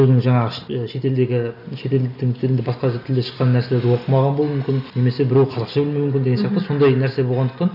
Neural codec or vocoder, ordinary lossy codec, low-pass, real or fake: none; none; 5.4 kHz; real